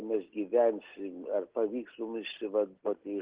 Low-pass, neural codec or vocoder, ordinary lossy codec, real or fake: 3.6 kHz; none; Opus, 16 kbps; real